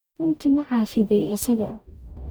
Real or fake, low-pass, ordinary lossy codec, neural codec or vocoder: fake; none; none; codec, 44.1 kHz, 0.9 kbps, DAC